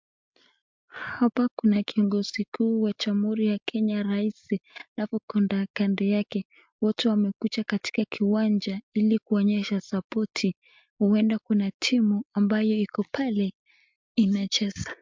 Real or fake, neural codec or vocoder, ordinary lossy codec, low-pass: real; none; MP3, 64 kbps; 7.2 kHz